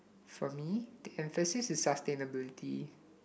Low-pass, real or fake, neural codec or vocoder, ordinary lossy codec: none; real; none; none